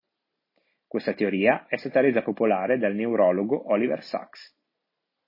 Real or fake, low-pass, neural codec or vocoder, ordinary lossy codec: real; 5.4 kHz; none; MP3, 24 kbps